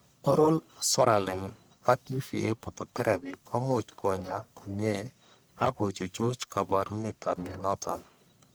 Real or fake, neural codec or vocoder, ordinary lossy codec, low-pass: fake; codec, 44.1 kHz, 1.7 kbps, Pupu-Codec; none; none